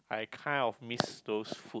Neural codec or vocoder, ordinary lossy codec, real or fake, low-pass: none; none; real; none